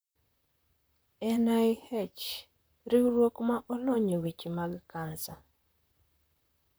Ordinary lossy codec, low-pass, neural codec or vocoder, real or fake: none; none; vocoder, 44.1 kHz, 128 mel bands, Pupu-Vocoder; fake